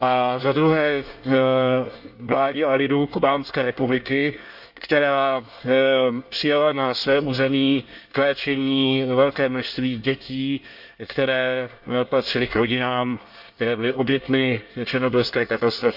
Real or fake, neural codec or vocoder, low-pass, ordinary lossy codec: fake; codec, 24 kHz, 1 kbps, SNAC; 5.4 kHz; Opus, 64 kbps